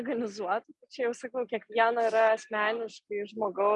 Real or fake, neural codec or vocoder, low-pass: real; none; 10.8 kHz